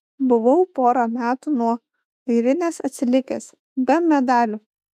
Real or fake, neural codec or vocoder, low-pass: fake; autoencoder, 48 kHz, 32 numbers a frame, DAC-VAE, trained on Japanese speech; 14.4 kHz